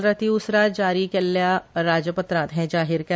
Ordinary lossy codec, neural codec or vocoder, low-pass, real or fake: none; none; none; real